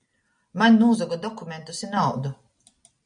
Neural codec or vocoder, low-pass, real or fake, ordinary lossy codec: none; 9.9 kHz; real; MP3, 96 kbps